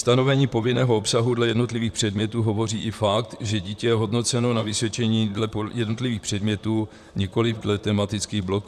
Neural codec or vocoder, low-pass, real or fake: vocoder, 44.1 kHz, 128 mel bands, Pupu-Vocoder; 14.4 kHz; fake